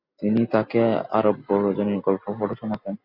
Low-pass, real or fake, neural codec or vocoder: 5.4 kHz; real; none